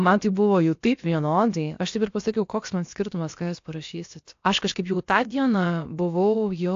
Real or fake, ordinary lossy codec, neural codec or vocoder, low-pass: fake; AAC, 48 kbps; codec, 16 kHz, about 1 kbps, DyCAST, with the encoder's durations; 7.2 kHz